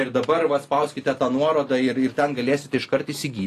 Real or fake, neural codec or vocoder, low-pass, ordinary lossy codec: real; none; 14.4 kHz; AAC, 48 kbps